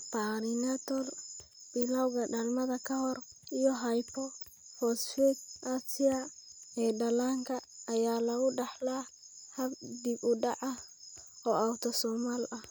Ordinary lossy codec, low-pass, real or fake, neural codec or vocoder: none; none; real; none